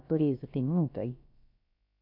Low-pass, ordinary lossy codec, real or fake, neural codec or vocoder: 5.4 kHz; none; fake; codec, 16 kHz, about 1 kbps, DyCAST, with the encoder's durations